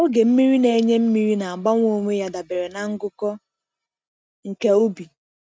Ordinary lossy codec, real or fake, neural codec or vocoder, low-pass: none; real; none; none